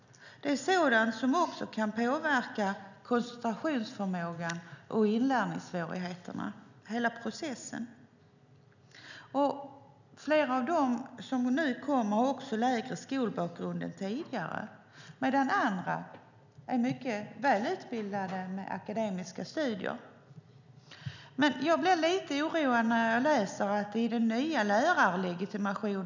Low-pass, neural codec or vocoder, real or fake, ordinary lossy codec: 7.2 kHz; none; real; none